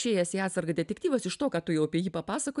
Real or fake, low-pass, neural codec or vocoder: real; 10.8 kHz; none